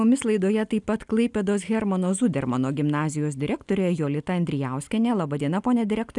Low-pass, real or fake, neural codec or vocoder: 10.8 kHz; real; none